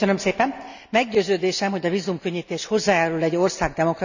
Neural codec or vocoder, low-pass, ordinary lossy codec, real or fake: none; 7.2 kHz; none; real